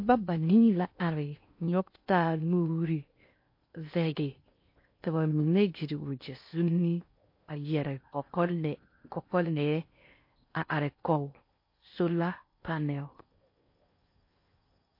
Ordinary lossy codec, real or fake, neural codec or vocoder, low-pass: MP3, 32 kbps; fake; codec, 16 kHz in and 24 kHz out, 0.8 kbps, FocalCodec, streaming, 65536 codes; 5.4 kHz